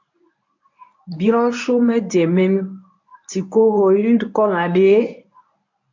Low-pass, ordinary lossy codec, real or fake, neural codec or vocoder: 7.2 kHz; AAC, 48 kbps; fake; codec, 24 kHz, 0.9 kbps, WavTokenizer, medium speech release version 1